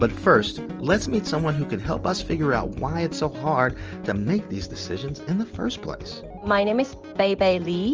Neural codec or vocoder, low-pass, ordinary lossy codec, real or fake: none; 7.2 kHz; Opus, 24 kbps; real